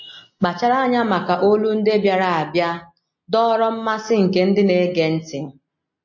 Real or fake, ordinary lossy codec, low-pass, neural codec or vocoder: real; MP3, 32 kbps; 7.2 kHz; none